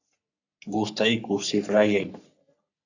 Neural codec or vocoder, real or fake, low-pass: codec, 44.1 kHz, 3.4 kbps, Pupu-Codec; fake; 7.2 kHz